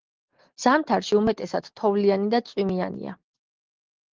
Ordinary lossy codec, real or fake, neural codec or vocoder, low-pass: Opus, 16 kbps; real; none; 7.2 kHz